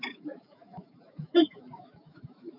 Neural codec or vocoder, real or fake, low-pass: autoencoder, 48 kHz, 128 numbers a frame, DAC-VAE, trained on Japanese speech; fake; 5.4 kHz